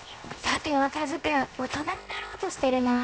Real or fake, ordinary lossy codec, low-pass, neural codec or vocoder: fake; none; none; codec, 16 kHz, 0.7 kbps, FocalCodec